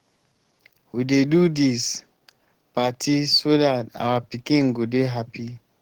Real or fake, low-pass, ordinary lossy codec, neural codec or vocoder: fake; 19.8 kHz; Opus, 16 kbps; codec, 44.1 kHz, 7.8 kbps, DAC